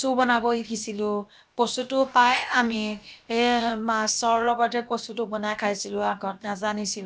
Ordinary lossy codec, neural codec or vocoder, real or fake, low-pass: none; codec, 16 kHz, about 1 kbps, DyCAST, with the encoder's durations; fake; none